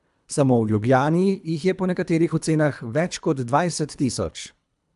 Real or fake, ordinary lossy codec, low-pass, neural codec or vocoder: fake; AAC, 96 kbps; 10.8 kHz; codec, 24 kHz, 3 kbps, HILCodec